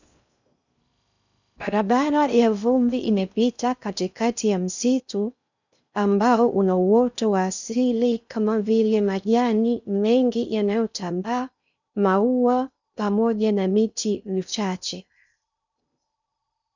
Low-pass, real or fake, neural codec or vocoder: 7.2 kHz; fake; codec, 16 kHz in and 24 kHz out, 0.6 kbps, FocalCodec, streaming, 2048 codes